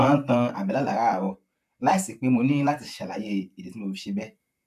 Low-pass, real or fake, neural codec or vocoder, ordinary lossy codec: 14.4 kHz; fake; vocoder, 44.1 kHz, 128 mel bands, Pupu-Vocoder; none